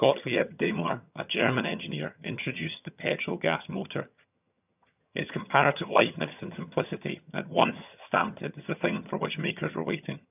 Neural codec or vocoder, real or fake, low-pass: vocoder, 22.05 kHz, 80 mel bands, HiFi-GAN; fake; 3.6 kHz